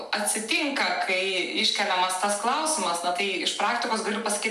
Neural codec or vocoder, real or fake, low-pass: none; real; 14.4 kHz